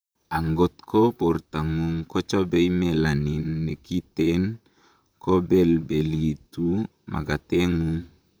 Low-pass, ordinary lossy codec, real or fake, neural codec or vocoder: none; none; fake; vocoder, 44.1 kHz, 128 mel bands, Pupu-Vocoder